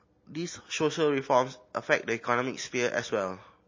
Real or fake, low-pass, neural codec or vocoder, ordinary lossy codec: real; 7.2 kHz; none; MP3, 32 kbps